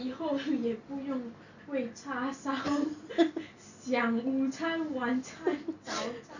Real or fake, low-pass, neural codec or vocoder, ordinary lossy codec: real; 7.2 kHz; none; none